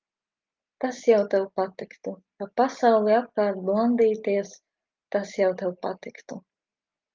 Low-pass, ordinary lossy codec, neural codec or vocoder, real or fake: 7.2 kHz; Opus, 24 kbps; none; real